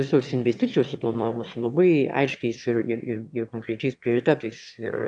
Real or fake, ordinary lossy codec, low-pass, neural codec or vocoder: fake; AAC, 64 kbps; 9.9 kHz; autoencoder, 22.05 kHz, a latent of 192 numbers a frame, VITS, trained on one speaker